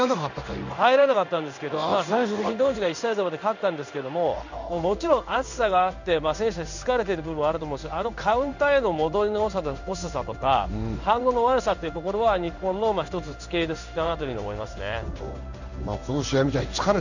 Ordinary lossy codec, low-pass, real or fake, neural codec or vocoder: none; 7.2 kHz; fake; codec, 16 kHz in and 24 kHz out, 1 kbps, XY-Tokenizer